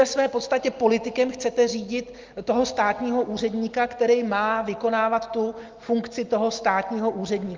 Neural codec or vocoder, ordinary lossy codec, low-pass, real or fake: none; Opus, 32 kbps; 7.2 kHz; real